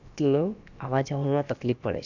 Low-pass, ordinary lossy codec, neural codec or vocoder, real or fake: 7.2 kHz; none; codec, 16 kHz, about 1 kbps, DyCAST, with the encoder's durations; fake